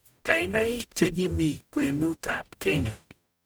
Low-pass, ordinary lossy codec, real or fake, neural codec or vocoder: none; none; fake; codec, 44.1 kHz, 0.9 kbps, DAC